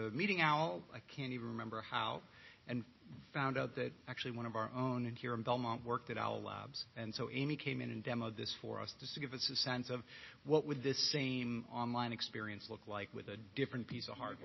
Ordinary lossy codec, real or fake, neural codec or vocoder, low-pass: MP3, 24 kbps; real; none; 7.2 kHz